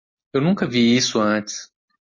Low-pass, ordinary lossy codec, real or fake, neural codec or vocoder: 7.2 kHz; MP3, 32 kbps; real; none